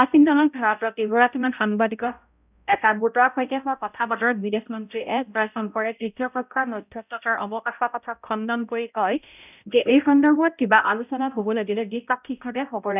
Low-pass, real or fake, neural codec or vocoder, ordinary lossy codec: 3.6 kHz; fake; codec, 16 kHz, 0.5 kbps, X-Codec, HuBERT features, trained on balanced general audio; none